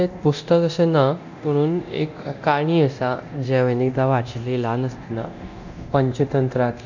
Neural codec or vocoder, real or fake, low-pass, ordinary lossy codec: codec, 24 kHz, 0.9 kbps, DualCodec; fake; 7.2 kHz; none